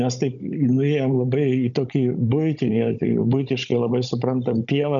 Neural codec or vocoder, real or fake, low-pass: codec, 16 kHz, 16 kbps, FunCodec, trained on Chinese and English, 50 frames a second; fake; 7.2 kHz